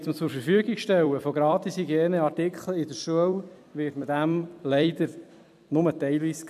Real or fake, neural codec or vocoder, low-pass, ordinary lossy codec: fake; vocoder, 44.1 kHz, 128 mel bands every 256 samples, BigVGAN v2; 14.4 kHz; MP3, 96 kbps